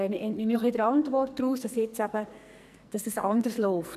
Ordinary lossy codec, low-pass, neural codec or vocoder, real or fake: none; 14.4 kHz; codec, 44.1 kHz, 2.6 kbps, SNAC; fake